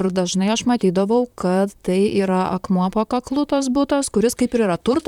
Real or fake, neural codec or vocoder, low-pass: fake; vocoder, 44.1 kHz, 128 mel bands, Pupu-Vocoder; 19.8 kHz